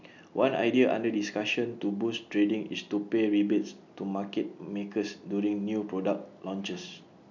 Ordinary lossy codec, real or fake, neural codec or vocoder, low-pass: none; real; none; 7.2 kHz